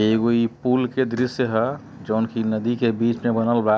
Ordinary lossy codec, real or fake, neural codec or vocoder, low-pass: none; real; none; none